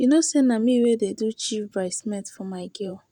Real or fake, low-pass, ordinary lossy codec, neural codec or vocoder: fake; 19.8 kHz; none; vocoder, 44.1 kHz, 128 mel bands every 512 samples, BigVGAN v2